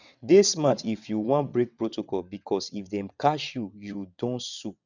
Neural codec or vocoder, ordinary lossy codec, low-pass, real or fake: vocoder, 22.05 kHz, 80 mel bands, WaveNeXt; none; 7.2 kHz; fake